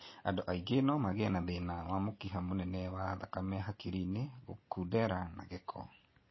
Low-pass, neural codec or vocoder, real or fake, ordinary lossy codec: 7.2 kHz; none; real; MP3, 24 kbps